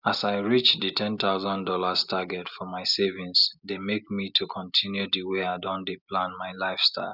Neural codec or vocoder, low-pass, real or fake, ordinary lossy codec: none; 5.4 kHz; real; none